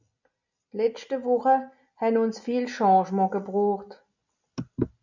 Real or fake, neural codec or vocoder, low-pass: real; none; 7.2 kHz